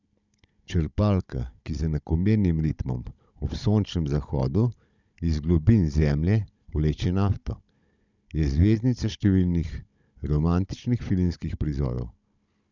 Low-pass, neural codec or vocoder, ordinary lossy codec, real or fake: 7.2 kHz; codec, 16 kHz, 16 kbps, FunCodec, trained on Chinese and English, 50 frames a second; none; fake